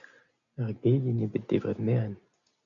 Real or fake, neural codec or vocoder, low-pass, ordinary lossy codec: real; none; 7.2 kHz; MP3, 96 kbps